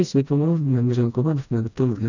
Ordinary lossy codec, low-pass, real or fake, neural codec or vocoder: none; 7.2 kHz; fake; codec, 16 kHz, 1 kbps, FreqCodec, smaller model